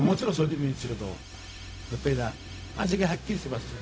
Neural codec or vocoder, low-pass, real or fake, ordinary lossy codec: codec, 16 kHz, 0.4 kbps, LongCat-Audio-Codec; none; fake; none